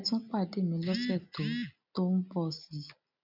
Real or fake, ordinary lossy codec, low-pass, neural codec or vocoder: real; none; 5.4 kHz; none